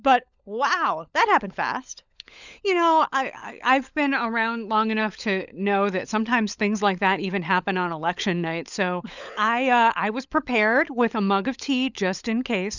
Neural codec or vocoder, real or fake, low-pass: codec, 16 kHz, 16 kbps, FunCodec, trained on LibriTTS, 50 frames a second; fake; 7.2 kHz